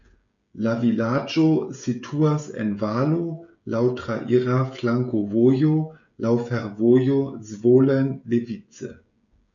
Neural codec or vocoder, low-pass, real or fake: codec, 16 kHz, 16 kbps, FreqCodec, smaller model; 7.2 kHz; fake